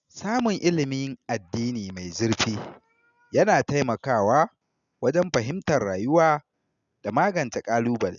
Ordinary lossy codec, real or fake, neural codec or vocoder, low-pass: none; real; none; 7.2 kHz